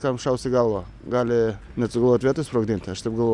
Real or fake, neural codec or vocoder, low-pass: real; none; 10.8 kHz